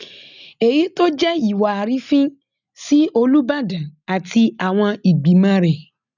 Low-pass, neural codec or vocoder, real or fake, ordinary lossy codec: 7.2 kHz; none; real; none